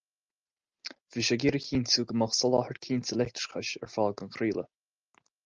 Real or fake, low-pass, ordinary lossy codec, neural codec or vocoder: real; 7.2 kHz; Opus, 24 kbps; none